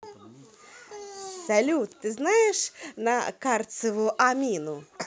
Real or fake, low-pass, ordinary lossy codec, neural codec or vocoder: real; none; none; none